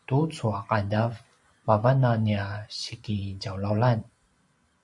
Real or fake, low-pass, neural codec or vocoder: fake; 10.8 kHz; vocoder, 44.1 kHz, 128 mel bands every 512 samples, BigVGAN v2